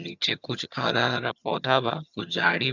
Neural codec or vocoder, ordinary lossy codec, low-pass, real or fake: vocoder, 22.05 kHz, 80 mel bands, HiFi-GAN; none; 7.2 kHz; fake